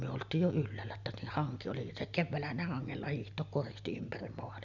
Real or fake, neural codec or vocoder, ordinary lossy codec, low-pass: real; none; none; 7.2 kHz